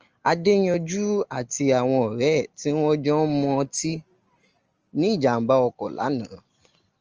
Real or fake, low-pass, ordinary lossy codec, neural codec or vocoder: real; 7.2 kHz; Opus, 24 kbps; none